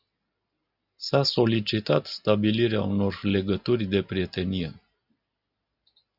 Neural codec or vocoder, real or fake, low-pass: none; real; 5.4 kHz